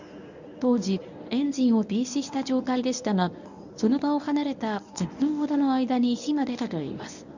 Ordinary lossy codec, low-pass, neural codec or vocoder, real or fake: none; 7.2 kHz; codec, 24 kHz, 0.9 kbps, WavTokenizer, medium speech release version 1; fake